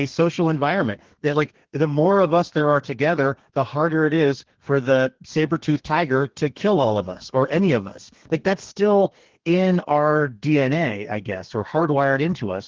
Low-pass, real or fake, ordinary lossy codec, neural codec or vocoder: 7.2 kHz; fake; Opus, 16 kbps; codec, 32 kHz, 1.9 kbps, SNAC